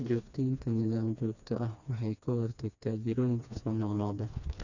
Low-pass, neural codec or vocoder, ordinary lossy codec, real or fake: 7.2 kHz; codec, 16 kHz, 2 kbps, FreqCodec, smaller model; none; fake